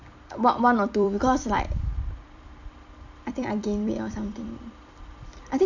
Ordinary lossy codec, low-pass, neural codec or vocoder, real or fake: none; 7.2 kHz; vocoder, 44.1 kHz, 128 mel bands every 256 samples, BigVGAN v2; fake